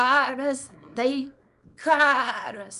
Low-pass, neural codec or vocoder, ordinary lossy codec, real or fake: 10.8 kHz; codec, 24 kHz, 0.9 kbps, WavTokenizer, small release; MP3, 96 kbps; fake